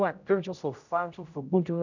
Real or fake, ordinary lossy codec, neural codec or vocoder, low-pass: fake; none; codec, 16 kHz, 0.5 kbps, X-Codec, HuBERT features, trained on general audio; 7.2 kHz